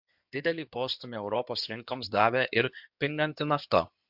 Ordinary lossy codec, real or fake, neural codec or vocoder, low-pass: MP3, 48 kbps; fake; codec, 16 kHz in and 24 kHz out, 2.2 kbps, FireRedTTS-2 codec; 5.4 kHz